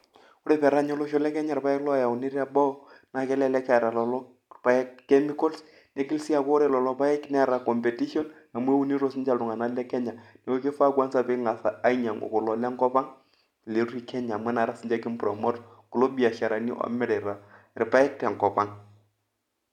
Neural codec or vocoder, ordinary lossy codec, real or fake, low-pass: none; none; real; 19.8 kHz